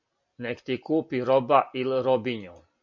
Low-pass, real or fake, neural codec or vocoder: 7.2 kHz; real; none